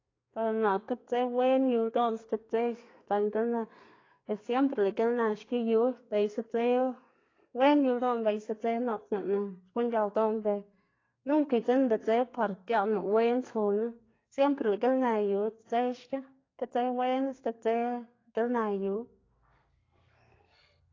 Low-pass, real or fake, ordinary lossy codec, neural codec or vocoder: 7.2 kHz; fake; AAC, 32 kbps; codec, 32 kHz, 1.9 kbps, SNAC